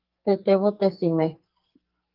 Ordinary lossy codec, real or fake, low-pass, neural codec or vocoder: Opus, 32 kbps; fake; 5.4 kHz; codec, 44.1 kHz, 2.6 kbps, SNAC